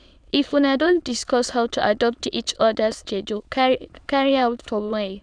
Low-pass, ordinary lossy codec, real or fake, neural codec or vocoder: 9.9 kHz; none; fake; autoencoder, 22.05 kHz, a latent of 192 numbers a frame, VITS, trained on many speakers